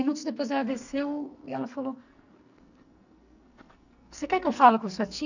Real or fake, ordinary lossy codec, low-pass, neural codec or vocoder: fake; none; 7.2 kHz; codec, 44.1 kHz, 2.6 kbps, SNAC